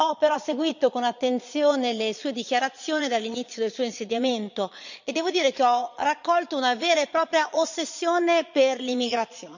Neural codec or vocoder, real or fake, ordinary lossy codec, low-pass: vocoder, 22.05 kHz, 80 mel bands, Vocos; fake; none; 7.2 kHz